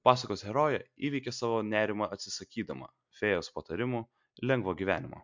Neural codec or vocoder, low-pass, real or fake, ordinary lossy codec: none; 7.2 kHz; real; MP3, 64 kbps